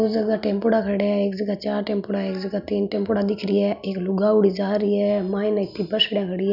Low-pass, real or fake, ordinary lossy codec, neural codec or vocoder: 5.4 kHz; real; none; none